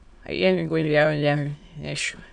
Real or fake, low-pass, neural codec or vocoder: fake; 9.9 kHz; autoencoder, 22.05 kHz, a latent of 192 numbers a frame, VITS, trained on many speakers